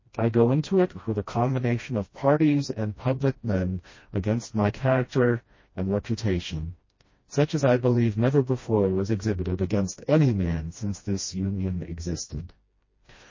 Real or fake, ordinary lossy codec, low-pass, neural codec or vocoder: fake; MP3, 32 kbps; 7.2 kHz; codec, 16 kHz, 1 kbps, FreqCodec, smaller model